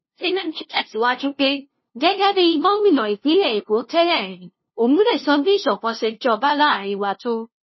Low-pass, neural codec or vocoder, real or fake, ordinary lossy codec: 7.2 kHz; codec, 16 kHz, 0.5 kbps, FunCodec, trained on LibriTTS, 25 frames a second; fake; MP3, 24 kbps